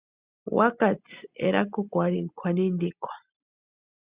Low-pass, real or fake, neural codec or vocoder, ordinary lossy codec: 3.6 kHz; real; none; Opus, 64 kbps